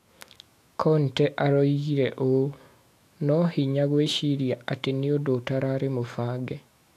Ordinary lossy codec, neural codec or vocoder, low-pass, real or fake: AAC, 64 kbps; autoencoder, 48 kHz, 128 numbers a frame, DAC-VAE, trained on Japanese speech; 14.4 kHz; fake